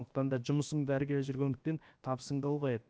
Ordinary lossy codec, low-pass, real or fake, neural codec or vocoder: none; none; fake; codec, 16 kHz, 0.7 kbps, FocalCodec